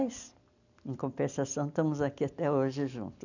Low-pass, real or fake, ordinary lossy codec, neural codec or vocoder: 7.2 kHz; real; none; none